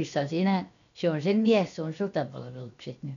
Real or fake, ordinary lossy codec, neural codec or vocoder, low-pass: fake; none; codec, 16 kHz, 0.7 kbps, FocalCodec; 7.2 kHz